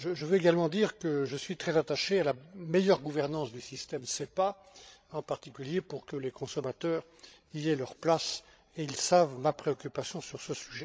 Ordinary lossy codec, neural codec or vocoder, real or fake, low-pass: none; codec, 16 kHz, 16 kbps, FreqCodec, larger model; fake; none